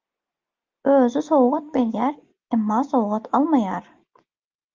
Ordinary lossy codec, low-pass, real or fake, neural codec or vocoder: Opus, 32 kbps; 7.2 kHz; real; none